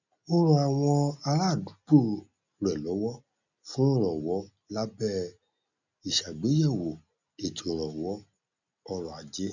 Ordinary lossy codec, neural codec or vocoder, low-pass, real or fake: none; none; 7.2 kHz; real